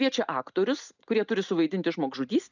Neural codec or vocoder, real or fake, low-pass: none; real; 7.2 kHz